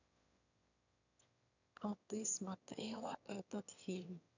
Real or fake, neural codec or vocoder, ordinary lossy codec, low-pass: fake; autoencoder, 22.05 kHz, a latent of 192 numbers a frame, VITS, trained on one speaker; none; 7.2 kHz